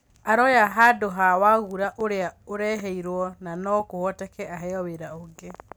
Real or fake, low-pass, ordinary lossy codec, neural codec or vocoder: real; none; none; none